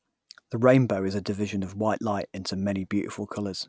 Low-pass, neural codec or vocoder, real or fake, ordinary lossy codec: none; none; real; none